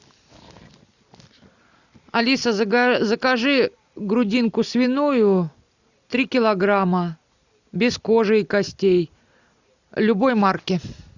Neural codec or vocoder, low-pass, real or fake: none; 7.2 kHz; real